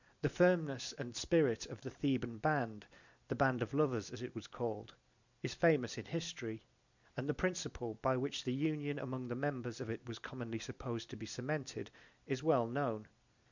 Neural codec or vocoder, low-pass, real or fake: none; 7.2 kHz; real